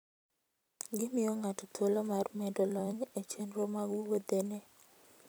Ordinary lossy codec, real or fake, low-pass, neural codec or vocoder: none; fake; none; vocoder, 44.1 kHz, 128 mel bands every 256 samples, BigVGAN v2